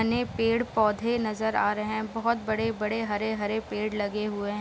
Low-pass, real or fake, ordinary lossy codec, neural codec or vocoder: none; real; none; none